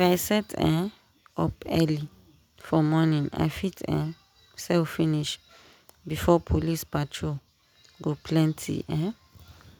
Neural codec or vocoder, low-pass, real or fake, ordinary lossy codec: none; none; real; none